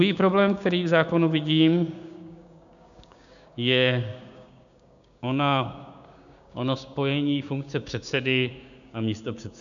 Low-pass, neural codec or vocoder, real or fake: 7.2 kHz; codec, 16 kHz, 6 kbps, DAC; fake